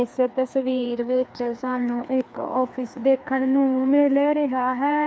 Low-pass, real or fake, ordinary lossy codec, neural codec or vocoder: none; fake; none; codec, 16 kHz, 2 kbps, FreqCodec, larger model